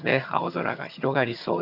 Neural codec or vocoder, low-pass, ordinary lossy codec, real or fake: vocoder, 22.05 kHz, 80 mel bands, HiFi-GAN; 5.4 kHz; none; fake